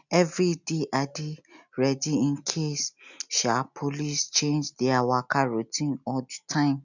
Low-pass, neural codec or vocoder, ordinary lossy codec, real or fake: 7.2 kHz; none; none; real